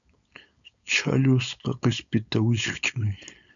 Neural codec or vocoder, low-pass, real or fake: codec, 16 kHz, 4.8 kbps, FACodec; 7.2 kHz; fake